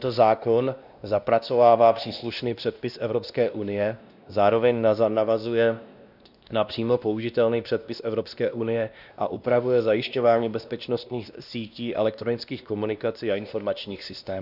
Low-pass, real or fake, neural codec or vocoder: 5.4 kHz; fake; codec, 16 kHz, 1 kbps, X-Codec, WavLM features, trained on Multilingual LibriSpeech